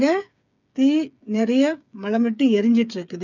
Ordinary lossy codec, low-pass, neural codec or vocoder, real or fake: none; 7.2 kHz; codec, 16 kHz, 8 kbps, FreqCodec, smaller model; fake